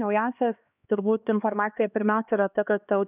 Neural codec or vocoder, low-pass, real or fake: codec, 16 kHz, 2 kbps, X-Codec, HuBERT features, trained on LibriSpeech; 3.6 kHz; fake